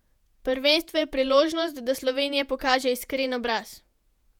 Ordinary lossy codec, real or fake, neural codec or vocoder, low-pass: none; fake; vocoder, 48 kHz, 128 mel bands, Vocos; 19.8 kHz